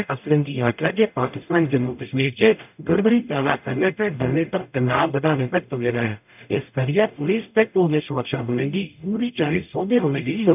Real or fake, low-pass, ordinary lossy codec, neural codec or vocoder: fake; 3.6 kHz; none; codec, 44.1 kHz, 0.9 kbps, DAC